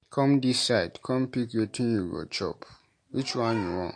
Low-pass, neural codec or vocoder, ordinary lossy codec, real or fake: 9.9 kHz; none; MP3, 48 kbps; real